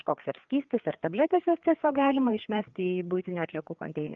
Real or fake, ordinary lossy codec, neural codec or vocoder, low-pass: fake; Opus, 24 kbps; codec, 16 kHz, 4 kbps, FreqCodec, larger model; 7.2 kHz